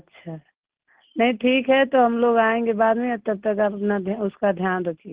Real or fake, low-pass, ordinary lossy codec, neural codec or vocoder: real; 3.6 kHz; Opus, 16 kbps; none